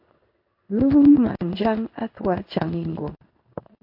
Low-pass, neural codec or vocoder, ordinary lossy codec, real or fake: 5.4 kHz; codec, 16 kHz in and 24 kHz out, 1 kbps, XY-Tokenizer; MP3, 32 kbps; fake